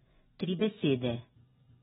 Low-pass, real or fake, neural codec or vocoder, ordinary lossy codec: 10.8 kHz; fake; vocoder, 24 kHz, 100 mel bands, Vocos; AAC, 16 kbps